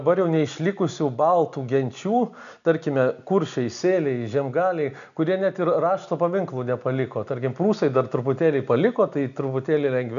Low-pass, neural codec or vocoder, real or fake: 7.2 kHz; none; real